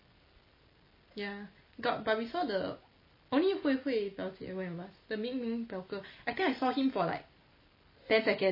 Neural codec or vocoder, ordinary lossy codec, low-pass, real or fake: none; MP3, 24 kbps; 5.4 kHz; real